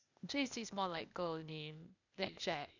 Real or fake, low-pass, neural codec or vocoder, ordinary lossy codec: fake; 7.2 kHz; codec, 16 kHz, 0.8 kbps, ZipCodec; none